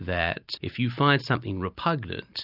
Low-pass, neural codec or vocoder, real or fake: 5.4 kHz; none; real